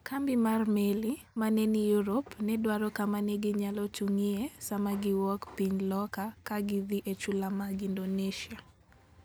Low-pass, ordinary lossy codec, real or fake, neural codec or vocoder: none; none; real; none